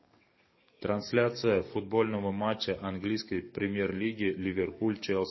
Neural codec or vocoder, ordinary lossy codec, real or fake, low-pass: codec, 44.1 kHz, 7.8 kbps, DAC; MP3, 24 kbps; fake; 7.2 kHz